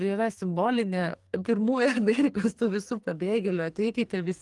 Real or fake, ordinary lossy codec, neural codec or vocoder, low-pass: fake; Opus, 24 kbps; codec, 44.1 kHz, 2.6 kbps, SNAC; 10.8 kHz